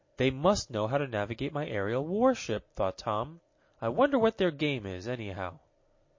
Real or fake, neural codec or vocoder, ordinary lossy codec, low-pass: real; none; MP3, 32 kbps; 7.2 kHz